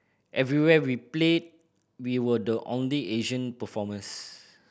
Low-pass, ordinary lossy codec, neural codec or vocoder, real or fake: none; none; none; real